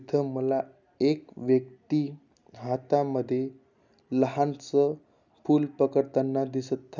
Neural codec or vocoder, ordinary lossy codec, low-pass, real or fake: none; none; 7.2 kHz; real